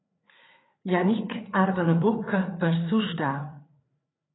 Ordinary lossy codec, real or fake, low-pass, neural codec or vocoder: AAC, 16 kbps; fake; 7.2 kHz; codec, 16 kHz, 4 kbps, FreqCodec, larger model